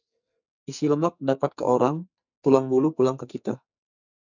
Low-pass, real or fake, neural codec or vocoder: 7.2 kHz; fake; codec, 44.1 kHz, 2.6 kbps, SNAC